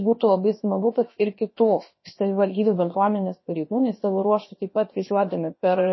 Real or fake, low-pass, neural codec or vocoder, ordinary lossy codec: fake; 7.2 kHz; codec, 16 kHz, 0.7 kbps, FocalCodec; MP3, 24 kbps